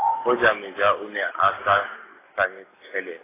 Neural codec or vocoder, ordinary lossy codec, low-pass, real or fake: none; AAC, 16 kbps; 3.6 kHz; real